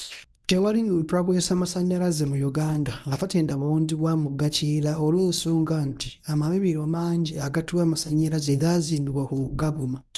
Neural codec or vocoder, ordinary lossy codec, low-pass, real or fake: codec, 24 kHz, 0.9 kbps, WavTokenizer, medium speech release version 1; none; none; fake